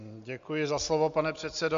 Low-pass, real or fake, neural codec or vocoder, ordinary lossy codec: 7.2 kHz; real; none; AAC, 64 kbps